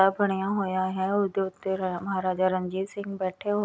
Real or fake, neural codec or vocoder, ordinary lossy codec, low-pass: real; none; none; none